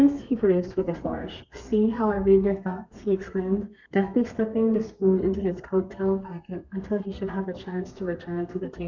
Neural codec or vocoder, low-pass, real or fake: codec, 32 kHz, 1.9 kbps, SNAC; 7.2 kHz; fake